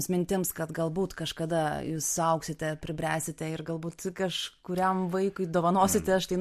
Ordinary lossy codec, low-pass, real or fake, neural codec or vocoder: MP3, 64 kbps; 14.4 kHz; real; none